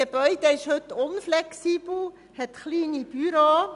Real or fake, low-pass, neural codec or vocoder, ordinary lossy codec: real; 10.8 kHz; none; none